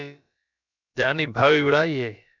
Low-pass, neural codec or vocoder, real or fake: 7.2 kHz; codec, 16 kHz, about 1 kbps, DyCAST, with the encoder's durations; fake